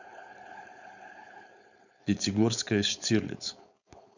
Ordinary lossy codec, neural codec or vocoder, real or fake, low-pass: none; codec, 16 kHz, 4.8 kbps, FACodec; fake; 7.2 kHz